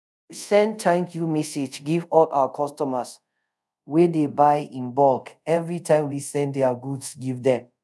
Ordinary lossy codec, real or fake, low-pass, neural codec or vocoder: none; fake; none; codec, 24 kHz, 0.5 kbps, DualCodec